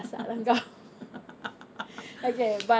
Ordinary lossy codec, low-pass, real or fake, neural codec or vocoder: none; none; real; none